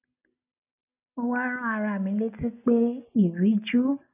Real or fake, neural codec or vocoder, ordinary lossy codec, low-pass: real; none; none; 3.6 kHz